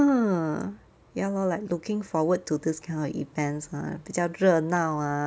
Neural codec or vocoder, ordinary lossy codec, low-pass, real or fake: none; none; none; real